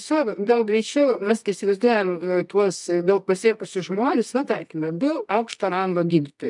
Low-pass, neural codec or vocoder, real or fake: 10.8 kHz; codec, 24 kHz, 0.9 kbps, WavTokenizer, medium music audio release; fake